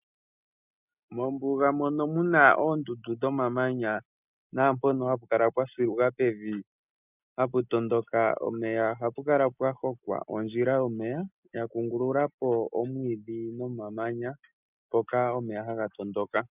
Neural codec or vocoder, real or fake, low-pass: none; real; 3.6 kHz